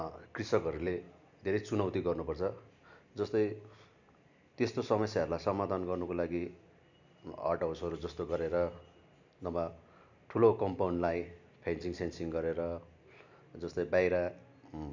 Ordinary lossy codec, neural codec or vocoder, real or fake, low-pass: none; none; real; 7.2 kHz